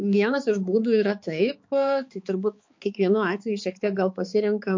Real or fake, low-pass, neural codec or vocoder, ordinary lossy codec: fake; 7.2 kHz; codec, 16 kHz, 4 kbps, X-Codec, HuBERT features, trained on balanced general audio; MP3, 48 kbps